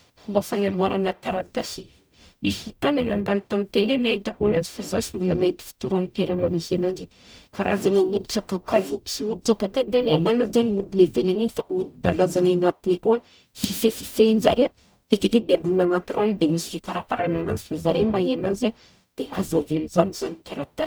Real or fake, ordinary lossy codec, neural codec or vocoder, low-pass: fake; none; codec, 44.1 kHz, 0.9 kbps, DAC; none